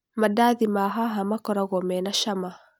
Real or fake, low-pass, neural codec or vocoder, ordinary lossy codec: real; none; none; none